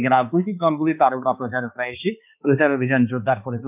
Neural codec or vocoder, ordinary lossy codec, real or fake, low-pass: codec, 16 kHz, 2 kbps, X-Codec, HuBERT features, trained on balanced general audio; none; fake; 3.6 kHz